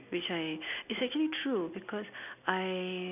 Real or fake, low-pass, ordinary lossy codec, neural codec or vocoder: real; 3.6 kHz; none; none